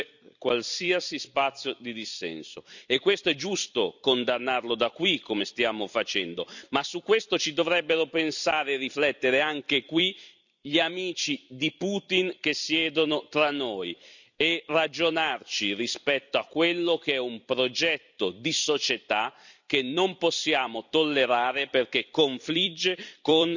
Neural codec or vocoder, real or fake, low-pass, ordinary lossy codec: vocoder, 44.1 kHz, 128 mel bands every 256 samples, BigVGAN v2; fake; 7.2 kHz; none